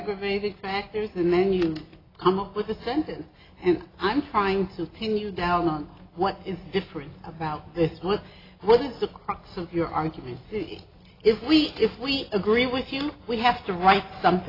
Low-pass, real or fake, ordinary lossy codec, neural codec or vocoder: 5.4 kHz; real; AAC, 24 kbps; none